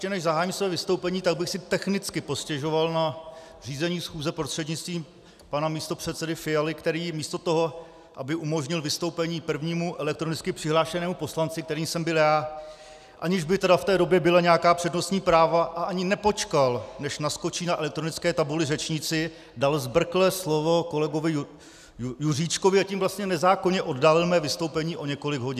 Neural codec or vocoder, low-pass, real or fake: none; 14.4 kHz; real